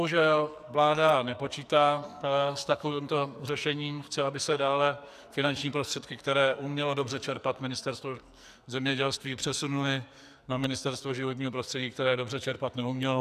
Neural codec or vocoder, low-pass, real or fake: codec, 44.1 kHz, 2.6 kbps, SNAC; 14.4 kHz; fake